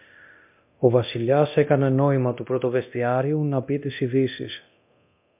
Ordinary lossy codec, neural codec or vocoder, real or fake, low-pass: MP3, 32 kbps; codec, 24 kHz, 0.9 kbps, DualCodec; fake; 3.6 kHz